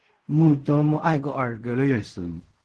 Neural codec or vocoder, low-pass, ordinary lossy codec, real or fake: codec, 16 kHz in and 24 kHz out, 0.4 kbps, LongCat-Audio-Codec, fine tuned four codebook decoder; 10.8 kHz; Opus, 16 kbps; fake